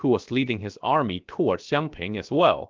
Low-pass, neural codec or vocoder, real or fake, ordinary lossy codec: 7.2 kHz; codec, 16 kHz, 0.7 kbps, FocalCodec; fake; Opus, 32 kbps